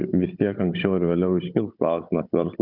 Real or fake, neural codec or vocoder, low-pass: fake; codec, 16 kHz, 16 kbps, FunCodec, trained on Chinese and English, 50 frames a second; 5.4 kHz